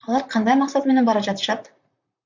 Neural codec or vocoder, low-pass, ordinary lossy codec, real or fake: vocoder, 44.1 kHz, 128 mel bands, Pupu-Vocoder; 7.2 kHz; MP3, 64 kbps; fake